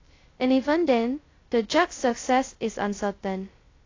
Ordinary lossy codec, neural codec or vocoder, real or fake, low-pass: AAC, 32 kbps; codec, 16 kHz, 0.2 kbps, FocalCodec; fake; 7.2 kHz